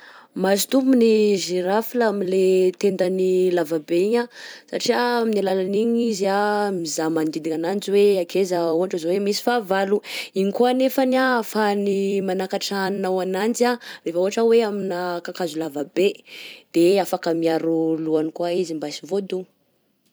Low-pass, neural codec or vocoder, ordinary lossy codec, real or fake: none; vocoder, 44.1 kHz, 128 mel bands every 256 samples, BigVGAN v2; none; fake